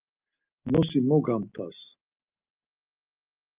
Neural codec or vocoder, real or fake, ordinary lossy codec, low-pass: none; real; Opus, 24 kbps; 3.6 kHz